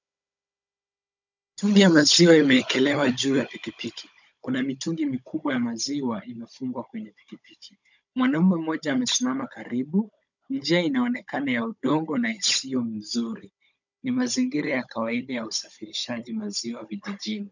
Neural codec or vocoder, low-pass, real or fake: codec, 16 kHz, 16 kbps, FunCodec, trained on Chinese and English, 50 frames a second; 7.2 kHz; fake